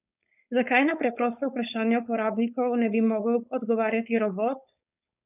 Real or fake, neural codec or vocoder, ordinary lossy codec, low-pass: fake; codec, 16 kHz, 4.8 kbps, FACodec; none; 3.6 kHz